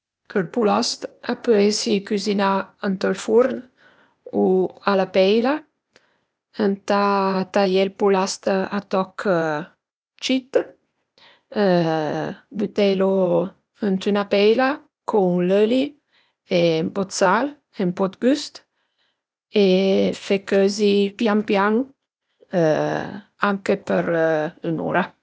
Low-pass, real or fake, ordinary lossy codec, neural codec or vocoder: none; fake; none; codec, 16 kHz, 0.8 kbps, ZipCodec